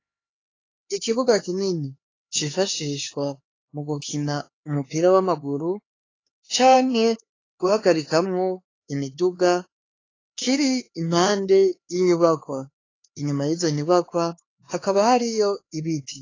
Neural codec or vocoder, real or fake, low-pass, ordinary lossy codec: codec, 16 kHz, 4 kbps, X-Codec, HuBERT features, trained on LibriSpeech; fake; 7.2 kHz; AAC, 32 kbps